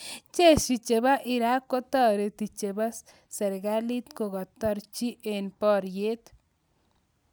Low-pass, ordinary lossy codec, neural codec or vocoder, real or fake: none; none; none; real